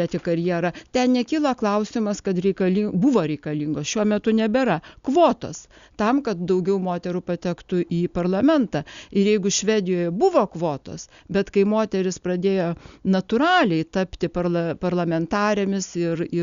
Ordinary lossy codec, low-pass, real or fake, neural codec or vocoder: Opus, 64 kbps; 7.2 kHz; real; none